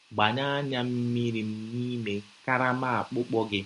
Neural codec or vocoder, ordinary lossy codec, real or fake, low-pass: none; MP3, 48 kbps; real; 14.4 kHz